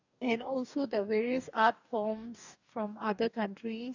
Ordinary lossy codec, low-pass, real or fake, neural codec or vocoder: none; 7.2 kHz; fake; codec, 44.1 kHz, 2.6 kbps, DAC